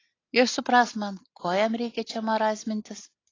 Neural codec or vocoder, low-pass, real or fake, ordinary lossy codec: none; 7.2 kHz; real; AAC, 32 kbps